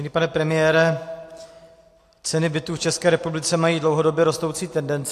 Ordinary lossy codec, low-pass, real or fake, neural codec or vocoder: AAC, 64 kbps; 14.4 kHz; real; none